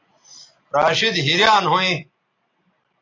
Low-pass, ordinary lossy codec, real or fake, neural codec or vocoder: 7.2 kHz; AAC, 32 kbps; real; none